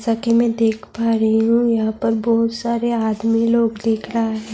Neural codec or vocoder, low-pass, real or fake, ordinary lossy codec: none; none; real; none